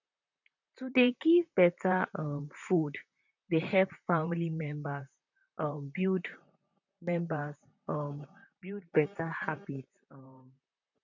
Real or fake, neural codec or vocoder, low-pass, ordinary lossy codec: fake; vocoder, 44.1 kHz, 128 mel bands, Pupu-Vocoder; 7.2 kHz; none